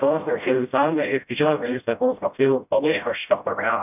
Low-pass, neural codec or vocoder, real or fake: 3.6 kHz; codec, 16 kHz, 0.5 kbps, FreqCodec, smaller model; fake